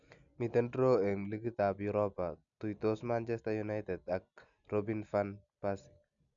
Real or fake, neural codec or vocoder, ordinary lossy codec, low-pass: real; none; none; 7.2 kHz